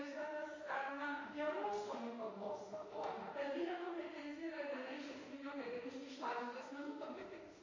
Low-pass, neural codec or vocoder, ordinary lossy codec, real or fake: 7.2 kHz; autoencoder, 48 kHz, 32 numbers a frame, DAC-VAE, trained on Japanese speech; MP3, 32 kbps; fake